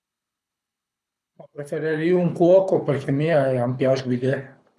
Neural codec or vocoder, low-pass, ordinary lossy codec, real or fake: codec, 24 kHz, 6 kbps, HILCodec; none; none; fake